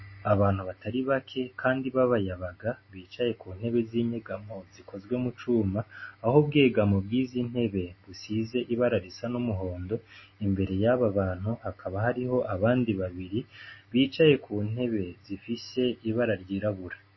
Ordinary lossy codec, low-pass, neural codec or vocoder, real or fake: MP3, 24 kbps; 7.2 kHz; none; real